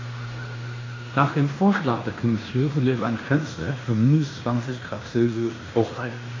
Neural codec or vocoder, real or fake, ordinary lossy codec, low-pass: codec, 16 kHz in and 24 kHz out, 0.9 kbps, LongCat-Audio-Codec, fine tuned four codebook decoder; fake; AAC, 32 kbps; 7.2 kHz